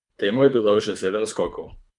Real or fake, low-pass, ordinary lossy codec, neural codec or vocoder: fake; 10.8 kHz; none; codec, 24 kHz, 3 kbps, HILCodec